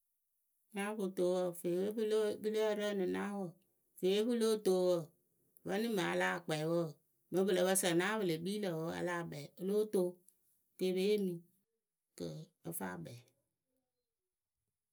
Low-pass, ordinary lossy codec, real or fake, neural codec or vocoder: none; none; real; none